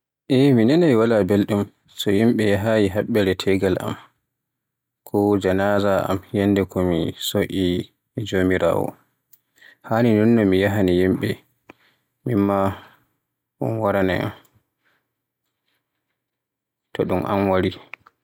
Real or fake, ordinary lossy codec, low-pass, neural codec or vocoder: real; none; 19.8 kHz; none